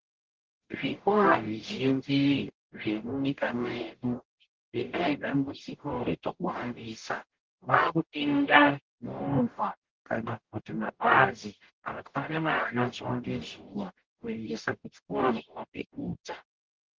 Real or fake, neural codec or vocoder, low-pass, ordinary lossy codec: fake; codec, 44.1 kHz, 0.9 kbps, DAC; 7.2 kHz; Opus, 16 kbps